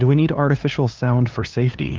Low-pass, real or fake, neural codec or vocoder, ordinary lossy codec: 7.2 kHz; fake; codec, 16 kHz, 2 kbps, FunCodec, trained on LibriTTS, 25 frames a second; Opus, 32 kbps